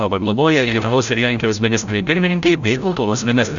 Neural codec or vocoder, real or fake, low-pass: codec, 16 kHz, 0.5 kbps, FreqCodec, larger model; fake; 7.2 kHz